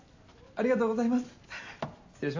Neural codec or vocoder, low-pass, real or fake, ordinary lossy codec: none; 7.2 kHz; real; AAC, 48 kbps